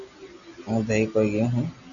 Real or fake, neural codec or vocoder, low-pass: real; none; 7.2 kHz